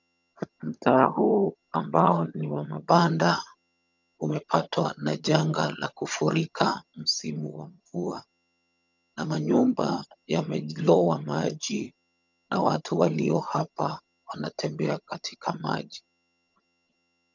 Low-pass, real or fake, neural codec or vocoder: 7.2 kHz; fake; vocoder, 22.05 kHz, 80 mel bands, HiFi-GAN